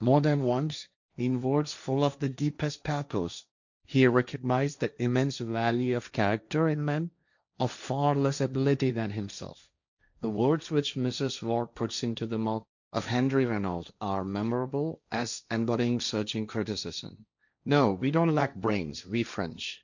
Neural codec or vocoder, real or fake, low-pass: codec, 16 kHz, 1.1 kbps, Voila-Tokenizer; fake; 7.2 kHz